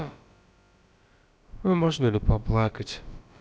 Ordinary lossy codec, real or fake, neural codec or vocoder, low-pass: none; fake; codec, 16 kHz, about 1 kbps, DyCAST, with the encoder's durations; none